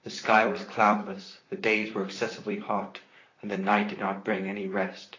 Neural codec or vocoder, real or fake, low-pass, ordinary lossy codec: vocoder, 44.1 kHz, 128 mel bands, Pupu-Vocoder; fake; 7.2 kHz; AAC, 32 kbps